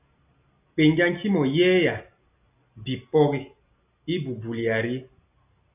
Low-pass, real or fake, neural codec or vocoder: 3.6 kHz; real; none